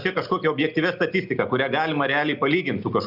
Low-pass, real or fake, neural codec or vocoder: 5.4 kHz; real; none